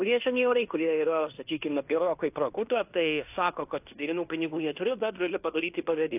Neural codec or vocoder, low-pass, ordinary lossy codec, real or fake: codec, 16 kHz in and 24 kHz out, 0.9 kbps, LongCat-Audio-Codec, fine tuned four codebook decoder; 3.6 kHz; AAC, 32 kbps; fake